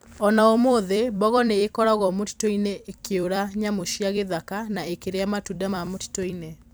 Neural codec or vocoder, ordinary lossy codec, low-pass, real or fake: vocoder, 44.1 kHz, 128 mel bands every 256 samples, BigVGAN v2; none; none; fake